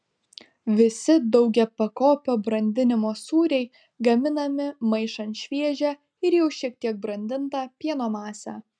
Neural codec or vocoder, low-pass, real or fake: none; 9.9 kHz; real